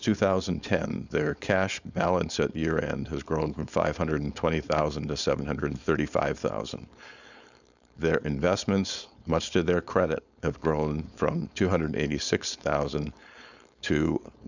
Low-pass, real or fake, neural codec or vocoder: 7.2 kHz; fake; codec, 16 kHz, 4.8 kbps, FACodec